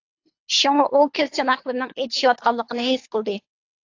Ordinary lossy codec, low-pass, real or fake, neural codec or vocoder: AAC, 48 kbps; 7.2 kHz; fake; codec, 24 kHz, 3 kbps, HILCodec